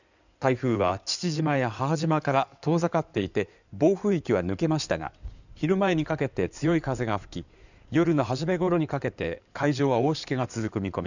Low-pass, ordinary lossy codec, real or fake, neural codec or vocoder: 7.2 kHz; none; fake; codec, 16 kHz in and 24 kHz out, 2.2 kbps, FireRedTTS-2 codec